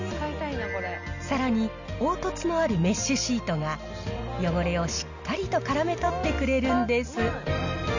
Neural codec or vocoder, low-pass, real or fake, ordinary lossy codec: none; 7.2 kHz; real; none